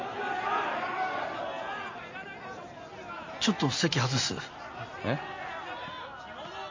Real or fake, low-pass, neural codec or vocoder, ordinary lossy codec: real; 7.2 kHz; none; MP3, 32 kbps